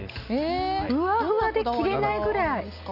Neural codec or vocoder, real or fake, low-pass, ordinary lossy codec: none; real; 5.4 kHz; none